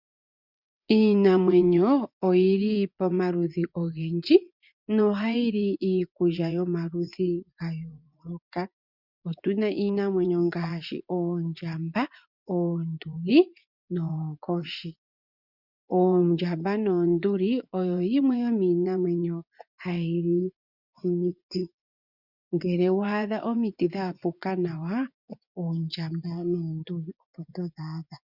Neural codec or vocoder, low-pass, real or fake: vocoder, 24 kHz, 100 mel bands, Vocos; 5.4 kHz; fake